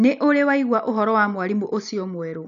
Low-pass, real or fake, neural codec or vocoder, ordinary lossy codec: 7.2 kHz; real; none; none